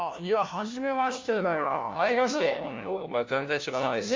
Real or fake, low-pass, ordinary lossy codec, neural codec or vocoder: fake; 7.2 kHz; MP3, 64 kbps; codec, 16 kHz, 1 kbps, FunCodec, trained on LibriTTS, 50 frames a second